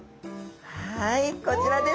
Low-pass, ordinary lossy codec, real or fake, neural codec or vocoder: none; none; real; none